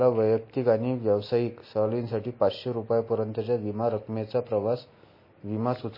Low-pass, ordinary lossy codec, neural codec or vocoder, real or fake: 5.4 kHz; MP3, 24 kbps; none; real